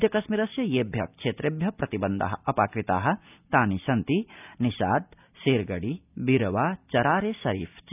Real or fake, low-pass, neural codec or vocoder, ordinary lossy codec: real; 3.6 kHz; none; none